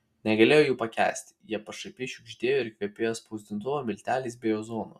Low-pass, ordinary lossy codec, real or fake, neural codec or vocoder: 14.4 kHz; Opus, 64 kbps; real; none